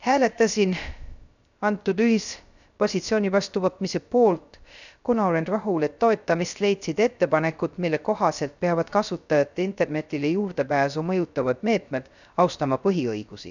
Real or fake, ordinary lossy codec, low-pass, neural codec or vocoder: fake; none; 7.2 kHz; codec, 16 kHz, 0.3 kbps, FocalCodec